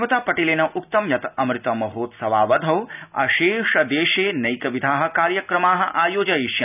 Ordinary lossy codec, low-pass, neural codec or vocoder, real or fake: none; 3.6 kHz; none; real